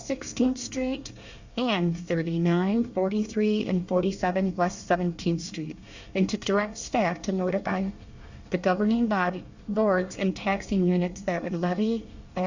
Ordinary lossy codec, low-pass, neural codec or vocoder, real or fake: Opus, 64 kbps; 7.2 kHz; codec, 24 kHz, 1 kbps, SNAC; fake